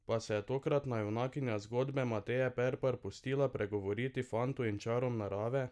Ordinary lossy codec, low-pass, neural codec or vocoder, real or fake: none; none; none; real